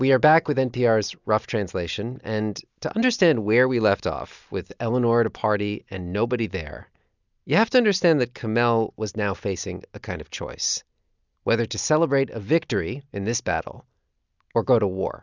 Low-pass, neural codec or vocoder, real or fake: 7.2 kHz; none; real